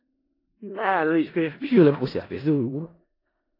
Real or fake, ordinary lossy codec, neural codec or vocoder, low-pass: fake; AAC, 24 kbps; codec, 16 kHz in and 24 kHz out, 0.4 kbps, LongCat-Audio-Codec, four codebook decoder; 5.4 kHz